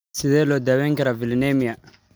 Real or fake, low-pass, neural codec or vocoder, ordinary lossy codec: real; none; none; none